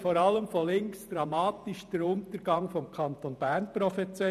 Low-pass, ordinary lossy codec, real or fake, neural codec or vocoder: 14.4 kHz; none; fake; vocoder, 48 kHz, 128 mel bands, Vocos